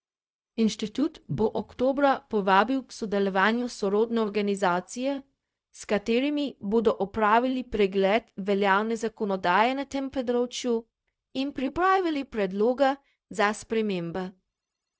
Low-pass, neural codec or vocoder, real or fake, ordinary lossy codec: none; codec, 16 kHz, 0.4 kbps, LongCat-Audio-Codec; fake; none